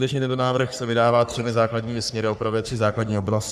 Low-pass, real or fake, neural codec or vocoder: 14.4 kHz; fake; codec, 44.1 kHz, 3.4 kbps, Pupu-Codec